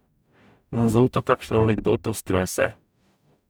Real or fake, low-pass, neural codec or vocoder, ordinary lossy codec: fake; none; codec, 44.1 kHz, 0.9 kbps, DAC; none